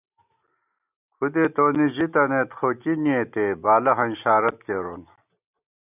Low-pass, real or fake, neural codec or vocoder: 3.6 kHz; real; none